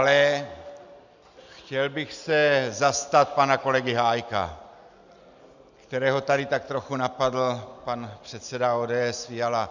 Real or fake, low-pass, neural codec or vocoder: real; 7.2 kHz; none